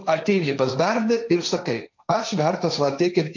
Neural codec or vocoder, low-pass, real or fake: codec, 16 kHz, 1.1 kbps, Voila-Tokenizer; 7.2 kHz; fake